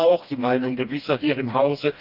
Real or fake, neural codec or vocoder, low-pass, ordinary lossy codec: fake; codec, 16 kHz, 1 kbps, FreqCodec, smaller model; 5.4 kHz; Opus, 24 kbps